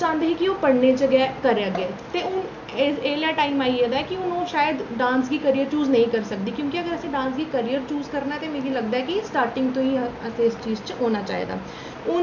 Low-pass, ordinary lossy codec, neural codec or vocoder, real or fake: 7.2 kHz; none; none; real